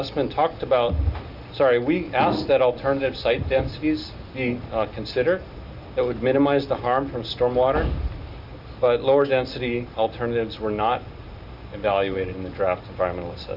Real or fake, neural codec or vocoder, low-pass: real; none; 5.4 kHz